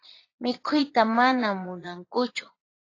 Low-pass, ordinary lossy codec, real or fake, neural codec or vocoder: 7.2 kHz; AAC, 32 kbps; fake; vocoder, 22.05 kHz, 80 mel bands, Vocos